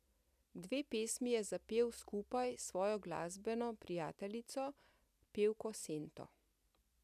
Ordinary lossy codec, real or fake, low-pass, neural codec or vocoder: none; real; 14.4 kHz; none